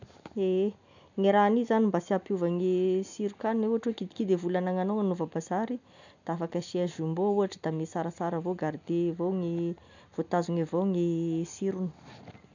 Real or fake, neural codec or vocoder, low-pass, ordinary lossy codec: real; none; 7.2 kHz; none